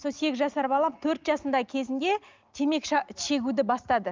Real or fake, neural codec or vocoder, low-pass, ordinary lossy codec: real; none; 7.2 kHz; Opus, 24 kbps